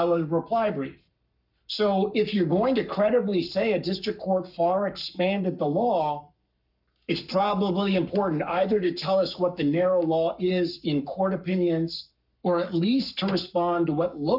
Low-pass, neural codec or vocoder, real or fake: 5.4 kHz; codec, 44.1 kHz, 7.8 kbps, Pupu-Codec; fake